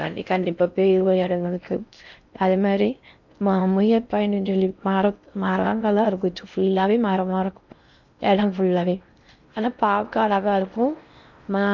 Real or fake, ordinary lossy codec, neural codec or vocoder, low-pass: fake; none; codec, 16 kHz in and 24 kHz out, 0.6 kbps, FocalCodec, streaming, 4096 codes; 7.2 kHz